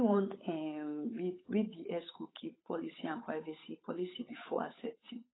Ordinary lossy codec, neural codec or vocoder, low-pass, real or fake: AAC, 16 kbps; codec, 16 kHz, 8 kbps, FunCodec, trained on Chinese and English, 25 frames a second; 7.2 kHz; fake